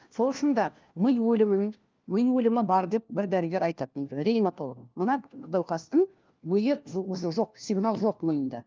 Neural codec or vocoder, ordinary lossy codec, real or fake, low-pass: codec, 16 kHz, 1 kbps, FunCodec, trained on LibriTTS, 50 frames a second; Opus, 32 kbps; fake; 7.2 kHz